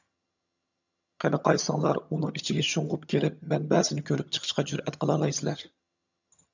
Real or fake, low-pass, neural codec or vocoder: fake; 7.2 kHz; vocoder, 22.05 kHz, 80 mel bands, HiFi-GAN